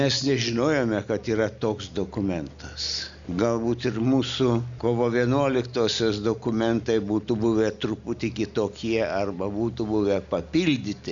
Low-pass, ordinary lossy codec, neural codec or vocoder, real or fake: 7.2 kHz; Opus, 64 kbps; none; real